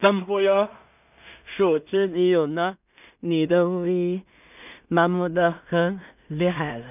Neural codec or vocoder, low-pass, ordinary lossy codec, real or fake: codec, 16 kHz in and 24 kHz out, 0.4 kbps, LongCat-Audio-Codec, two codebook decoder; 3.6 kHz; none; fake